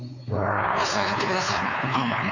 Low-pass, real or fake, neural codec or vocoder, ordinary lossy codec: 7.2 kHz; fake; codec, 16 kHz, 4 kbps, X-Codec, WavLM features, trained on Multilingual LibriSpeech; none